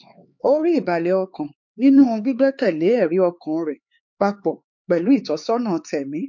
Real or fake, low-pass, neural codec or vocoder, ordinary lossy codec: fake; 7.2 kHz; codec, 16 kHz, 4 kbps, X-Codec, HuBERT features, trained on LibriSpeech; MP3, 48 kbps